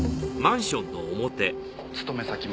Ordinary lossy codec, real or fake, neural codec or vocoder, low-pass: none; real; none; none